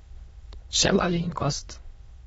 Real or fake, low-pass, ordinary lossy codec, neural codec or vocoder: fake; 9.9 kHz; AAC, 24 kbps; autoencoder, 22.05 kHz, a latent of 192 numbers a frame, VITS, trained on many speakers